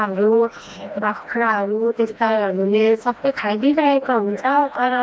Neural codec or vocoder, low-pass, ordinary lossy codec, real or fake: codec, 16 kHz, 1 kbps, FreqCodec, smaller model; none; none; fake